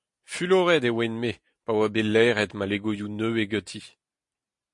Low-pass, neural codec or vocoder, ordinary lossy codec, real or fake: 10.8 kHz; none; MP3, 48 kbps; real